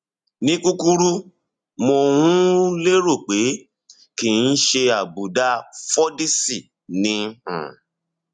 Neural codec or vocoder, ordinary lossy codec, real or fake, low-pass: none; none; real; 9.9 kHz